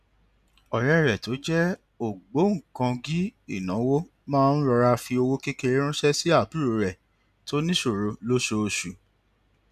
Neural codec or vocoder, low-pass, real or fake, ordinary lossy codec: none; 14.4 kHz; real; none